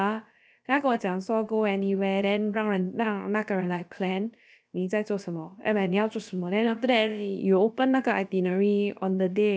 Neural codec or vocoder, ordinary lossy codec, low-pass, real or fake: codec, 16 kHz, about 1 kbps, DyCAST, with the encoder's durations; none; none; fake